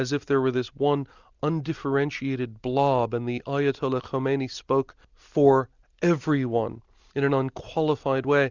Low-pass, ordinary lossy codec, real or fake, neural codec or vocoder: 7.2 kHz; Opus, 64 kbps; real; none